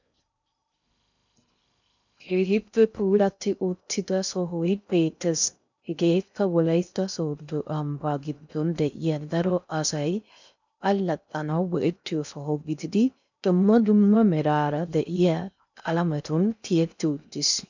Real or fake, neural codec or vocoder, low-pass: fake; codec, 16 kHz in and 24 kHz out, 0.6 kbps, FocalCodec, streaming, 2048 codes; 7.2 kHz